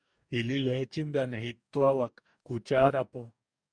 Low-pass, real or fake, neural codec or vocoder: 9.9 kHz; fake; codec, 44.1 kHz, 2.6 kbps, DAC